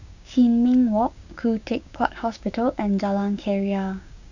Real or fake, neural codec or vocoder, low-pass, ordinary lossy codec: fake; codec, 16 kHz, 6 kbps, DAC; 7.2 kHz; none